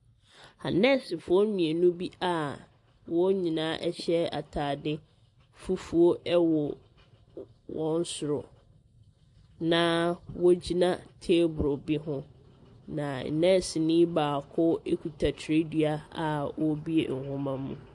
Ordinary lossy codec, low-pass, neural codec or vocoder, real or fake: AAC, 64 kbps; 10.8 kHz; none; real